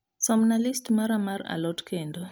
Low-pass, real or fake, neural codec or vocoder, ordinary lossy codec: none; real; none; none